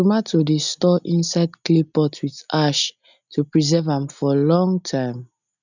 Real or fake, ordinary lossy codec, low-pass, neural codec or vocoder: real; none; 7.2 kHz; none